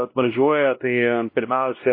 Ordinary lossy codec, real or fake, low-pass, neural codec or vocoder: MP3, 24 kbps; fake; 5.4 kHz; codec, 16 kHz, 1 kbps, X-Codec, WavLM features, trained on Multilingual LibriSpeech